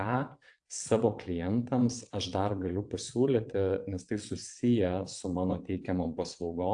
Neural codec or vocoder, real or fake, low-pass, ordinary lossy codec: vocoder, 22.05 kHz, 80 mel bands, WaveNeXt; fake; 9.9 kHz; AAC, 64 kbps